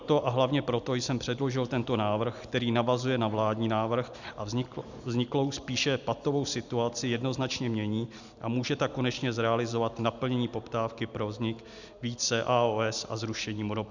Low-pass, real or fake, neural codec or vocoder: 7.2 kHz; real; none